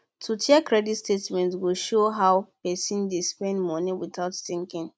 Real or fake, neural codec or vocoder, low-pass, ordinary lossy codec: real; none; none; none